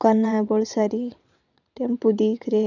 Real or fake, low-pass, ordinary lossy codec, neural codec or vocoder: fake; 7.2 kHz; none; vocoder, 22.05 kHz, 80 mel bands, WaveNeXt